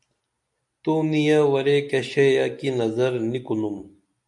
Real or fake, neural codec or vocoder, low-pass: real; none; 10.8 kHz